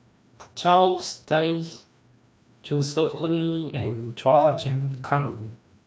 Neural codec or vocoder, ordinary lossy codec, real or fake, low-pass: codec, 16 kHz, 1 kbps, FreqCodec, larger model; none; fake; none